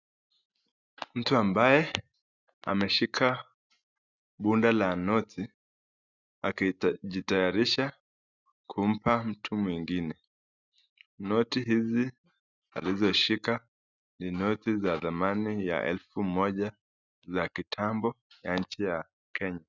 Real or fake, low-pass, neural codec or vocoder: real; 7.2 kHz; none